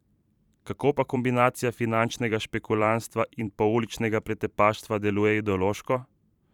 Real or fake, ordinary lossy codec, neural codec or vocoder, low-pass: fake; none; vocoder, 44.1 kHz, 128 mel bands every 512 samples, BigVGAN v2; 19.8 kHz